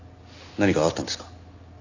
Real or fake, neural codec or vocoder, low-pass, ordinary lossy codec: real; none; 7.2 kHz; none